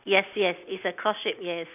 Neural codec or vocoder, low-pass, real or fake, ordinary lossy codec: none; 3.6 kHz; real; none